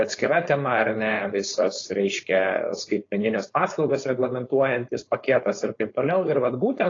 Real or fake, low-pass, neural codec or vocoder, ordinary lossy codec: fake; 7.2 kHz; codec, 16 kHz, 4.8 kbps, FACodec; AAC, 32 kbps